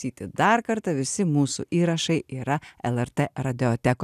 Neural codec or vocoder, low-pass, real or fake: none; 14.4 kHz; real